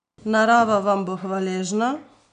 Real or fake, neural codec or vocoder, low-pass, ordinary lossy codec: real; none; 9.9 kHz; none